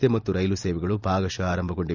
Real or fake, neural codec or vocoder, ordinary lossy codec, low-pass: real; none; none; 7.2 kHz